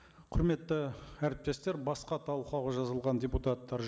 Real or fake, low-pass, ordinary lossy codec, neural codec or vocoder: real; none; none; none